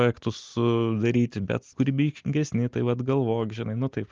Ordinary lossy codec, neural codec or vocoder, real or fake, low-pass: Opus, 24 kbps; none; real; 7.2 kHz